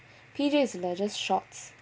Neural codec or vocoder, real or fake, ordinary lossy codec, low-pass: none; real; none; none